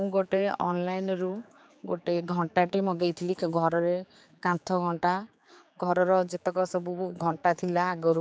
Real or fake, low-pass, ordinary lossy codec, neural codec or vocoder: fake; none; none; codec, 16 kHz, 4 kbps, X-Codec, HuBERT features, trained on general audio